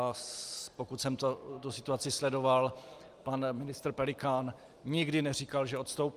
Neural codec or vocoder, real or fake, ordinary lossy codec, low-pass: none; real; Opus, 32 kbps; 14.4 kHz